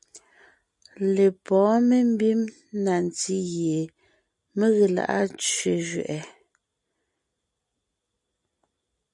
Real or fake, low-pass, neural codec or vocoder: real; 10.8 kHz; none